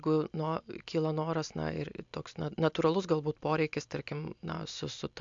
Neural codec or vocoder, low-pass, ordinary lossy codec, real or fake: none; 7.2 kHz; AAC, 64 kbps; real